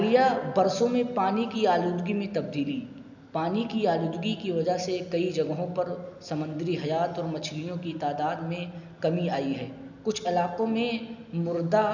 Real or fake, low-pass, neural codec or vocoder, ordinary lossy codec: real; 7.2 kHz; none; none